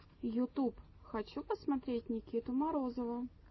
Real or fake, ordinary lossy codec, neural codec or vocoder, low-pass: real; MP3, 24 kbps; none; 7.2 kHz